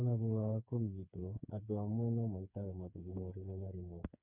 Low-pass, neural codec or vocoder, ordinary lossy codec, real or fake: 3.6 kHz; codec, 16 kHz, 4 kbps, FreqCodec, smaller model; none; fake